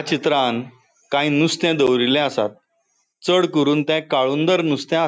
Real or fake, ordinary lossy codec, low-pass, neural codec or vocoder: real; none; none; none